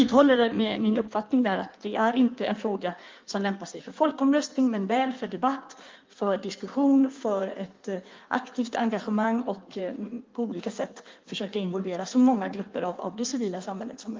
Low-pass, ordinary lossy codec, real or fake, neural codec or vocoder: 7.2 kHz; Opus, 32 kbps; fake; codec, 16 kHz in and 24 kHz out, 1.1 kbps, FireRedTTS-2 codec